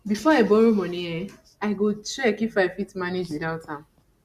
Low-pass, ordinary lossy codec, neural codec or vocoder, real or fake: 14.4 kHz; Opus, 64 kbps; none; real